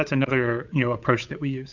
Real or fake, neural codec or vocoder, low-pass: fake; codec, 16 kHz, 8 kbps, FunCodec, trained on Chinese and English, 25 frames a second; 7.2 kHz